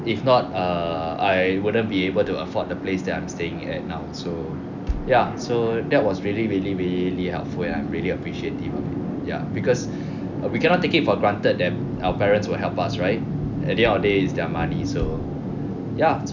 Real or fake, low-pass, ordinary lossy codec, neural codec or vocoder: real; 7.2 kHz; none; none